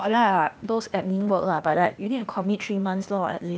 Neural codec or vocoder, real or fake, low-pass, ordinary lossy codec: codec, 16 kHz, 0.8 kbps, ZipCodec; fake; none; none